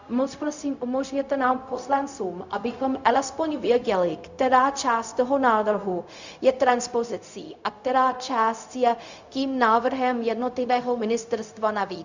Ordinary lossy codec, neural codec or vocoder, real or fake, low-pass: Opus, 64 kbps; codec, 16 kHz, 0.4 kbps, LongCat-Audio-Codec; fake; 7.2 kHz